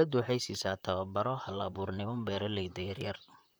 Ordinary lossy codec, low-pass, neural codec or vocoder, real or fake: none; none; vocoder, 44.1 kHz, 128 mel bands, Pupu-Vocoder; fake